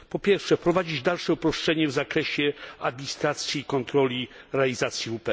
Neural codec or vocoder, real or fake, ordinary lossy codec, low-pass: none; real; none; none